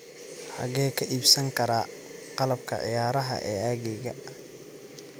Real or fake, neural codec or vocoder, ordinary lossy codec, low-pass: real; none; none; none